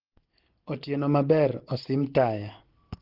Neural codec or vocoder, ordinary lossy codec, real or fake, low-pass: none; Opus, 16 kbps; real; 5.4 kHz